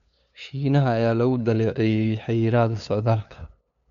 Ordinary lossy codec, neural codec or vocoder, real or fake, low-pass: MP3, 96 kbps; codec, 16 kHz, 2 kbps, FunCodec, trained on LibriTTS, 25 frames a second; fake; 7.2 kHz